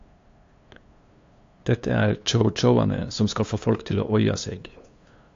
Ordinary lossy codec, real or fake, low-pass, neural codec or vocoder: AAC, 48 kbps; fake; 7.2 kHz; codec, 16 kHz, 2 kbps, FunCodec, trained on LibriTTS, 25 frames a second